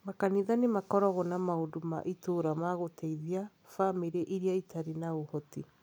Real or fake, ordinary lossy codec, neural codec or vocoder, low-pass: real; none; none; none